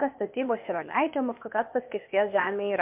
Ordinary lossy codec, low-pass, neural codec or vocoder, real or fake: MP3, 32 kbps; 3.6 kHz; codec, 16 kHz, 0.8 kbps, ZipCodec; fake